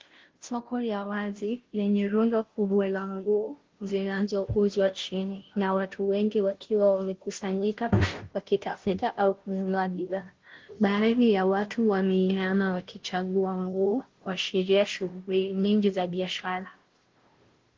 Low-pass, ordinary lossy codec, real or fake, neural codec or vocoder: 7.2 kHz; Opus, 16 kbps; fake; codec, 16 kHz, 0.5 kbps, FunCodec, trained on Chinese and English, 25 frames a second